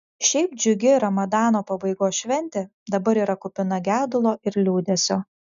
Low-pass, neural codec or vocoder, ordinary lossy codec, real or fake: 7.2 kHz; none; MP3, 96 kbps; real